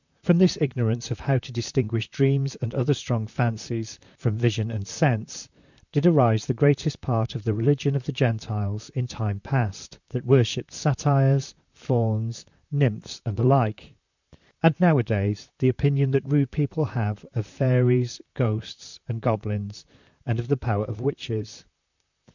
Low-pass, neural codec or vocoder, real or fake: 7.2 kHz; vocoder, 44.1 kHz, 128 mel bands, Pupu-Vocoder; fake